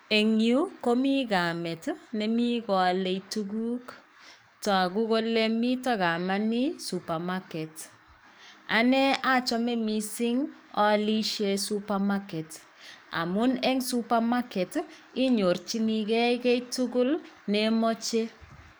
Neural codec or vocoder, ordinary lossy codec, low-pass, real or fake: codec, 44.1 kHz, 7.8 kbps, DAC; none; none; fake